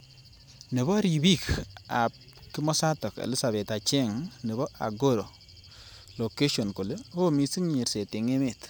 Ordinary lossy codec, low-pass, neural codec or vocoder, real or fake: none; none; vocoder, 44.1 kHz, 128 mel bands every 512 samples, BigVGAN v2; fake